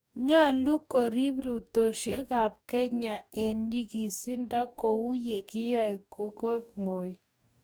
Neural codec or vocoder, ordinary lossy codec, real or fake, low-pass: codec, 44.1 kHz, 2.6 kbps, DAC; none; fake; none